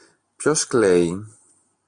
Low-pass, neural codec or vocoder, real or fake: 9.9 kHz; none; real